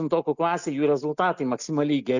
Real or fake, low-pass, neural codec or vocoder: real; 7.2 kHz; none